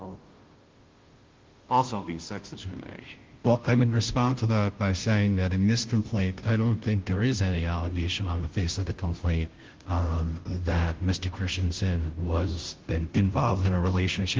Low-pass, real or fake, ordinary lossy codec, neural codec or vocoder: 7.2 kHz; fake; Opus, 16 kbps; codec, 16 kHz, 0.5 kbps, FunCodec, trained on Chinese and English, 25 frames a second